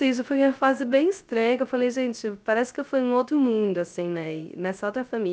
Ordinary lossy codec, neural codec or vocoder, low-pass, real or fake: none; codec, 16 kHz, 0.3 kbps, FocalCodec; none; fake